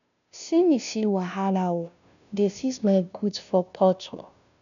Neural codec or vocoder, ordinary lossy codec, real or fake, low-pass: codec, 16 kHz, 0.5 kbps, FunCodec, trained on Chinese and English, 25 frames a second; MP3, 96 kbps; fake; 7.2 kHz